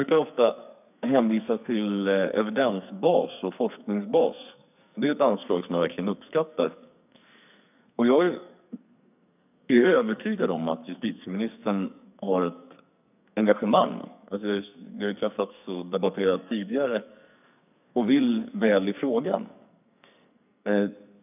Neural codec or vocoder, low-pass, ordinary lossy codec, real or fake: codec, 44.1 kHz, 2.6 kbps, SNAC; 3.6 kHz; none; fake